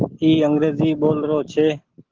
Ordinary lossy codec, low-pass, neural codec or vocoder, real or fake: Opus, 16 kbps; 7.2 kHz; none; real